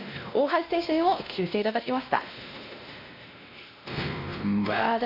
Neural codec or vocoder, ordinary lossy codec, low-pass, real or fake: codec, 16 kHz, 1 kbps, X-Codec, WavLM features, trained on Multilingual LibriSpeech; MP3, 48 kbps; 5.4 kHz; fake